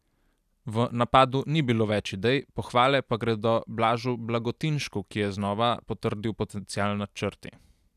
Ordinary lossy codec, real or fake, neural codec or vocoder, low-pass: none; real; none; 14.4 kHz